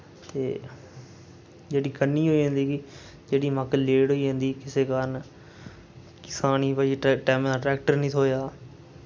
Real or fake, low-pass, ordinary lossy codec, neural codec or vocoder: real; none; none; none